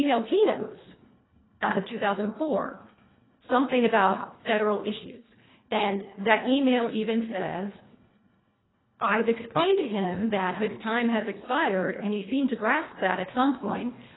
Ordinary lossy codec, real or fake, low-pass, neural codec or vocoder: AAC, 16 kbps; fake; 7.2 kHz; codec, 24 kHz, 1.5 kbps, HILCodec